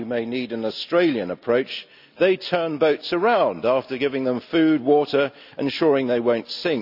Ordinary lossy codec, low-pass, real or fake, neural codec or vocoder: none; 5.4 kHz; real; none